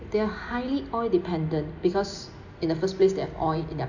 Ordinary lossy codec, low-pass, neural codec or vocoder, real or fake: none; 7.2 kHz; none; real